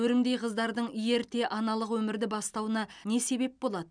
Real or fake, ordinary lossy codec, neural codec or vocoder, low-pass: real; none; none; 9.9 kHz